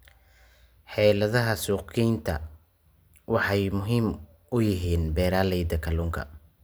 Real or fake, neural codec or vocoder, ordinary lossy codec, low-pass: real; none; none; none